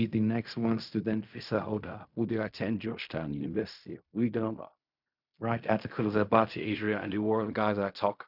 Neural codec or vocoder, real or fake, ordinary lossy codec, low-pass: codec, 16 kHz in and 24 kHz out, 0.4 kbps, LongCat-Audio-Codec, fine tuned four codebook decoder; fake; none; 5.4 kHz